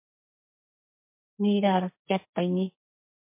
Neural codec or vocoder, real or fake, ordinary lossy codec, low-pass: codec, 44.1 kHz, 2.6 kbps, SNAC; fake; MP3, 24 kbps; 3.6 kHz